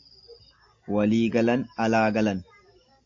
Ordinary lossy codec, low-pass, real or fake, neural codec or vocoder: AAC, 48 kbps; 7.2 kHz; real; none